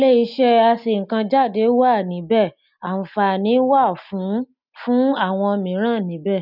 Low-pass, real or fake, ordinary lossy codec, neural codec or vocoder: 5.4 kHz; real; none; none